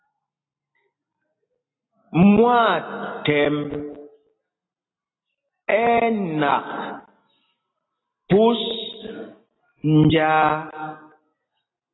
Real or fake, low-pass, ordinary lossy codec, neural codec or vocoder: real; 7.2 kHz; AAC, 16 kbps; none